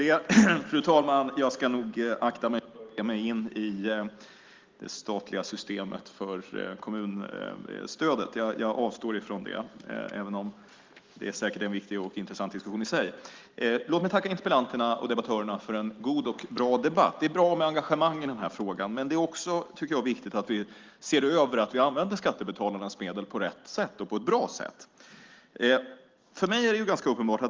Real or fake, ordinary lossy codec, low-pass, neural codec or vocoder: real; Opus, 32 kbps; 7.2 kHz; none